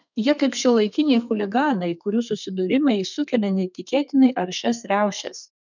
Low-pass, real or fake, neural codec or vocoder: 7.2 kHz; fake; codec, 32 kHz, 1.9 kbps, SNAC